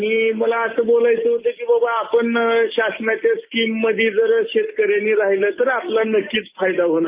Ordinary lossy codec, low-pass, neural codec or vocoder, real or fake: Opus, 32 kbps; 3.6 kHz; none; real